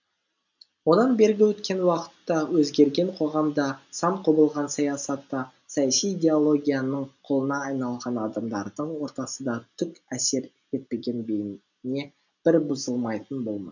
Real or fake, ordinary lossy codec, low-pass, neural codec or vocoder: real; none; 7.2 kHz; none